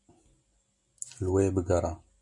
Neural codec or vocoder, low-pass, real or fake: none; 10.8 kHz; real